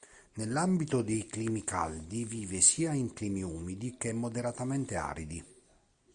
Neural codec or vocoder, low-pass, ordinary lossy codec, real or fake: none; 9.9 kHz; Opus, 64 kbps; real